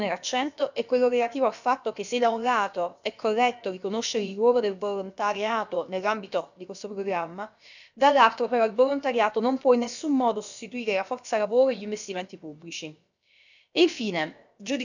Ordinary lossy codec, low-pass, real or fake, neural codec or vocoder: none; 7.2 kHz; fake; codec, 16 kHz, about 1 kbps, DyCAST, with the encoder's durations